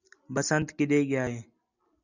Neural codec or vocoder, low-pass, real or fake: none; 7.2 kHz; real